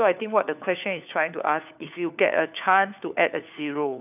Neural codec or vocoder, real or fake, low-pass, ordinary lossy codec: codec, 16 kHz, 4 kbps, FunCodec, trained on LibriTTS, 50 frames a second; fake; 3.6 kHz; none